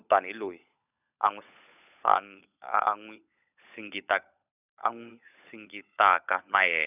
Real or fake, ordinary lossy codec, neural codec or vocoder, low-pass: fake; none; codec, 16 kHz, 16 kbps, FunCodec, trained on LibriTTS, 50 frames a second; 3.6 kHz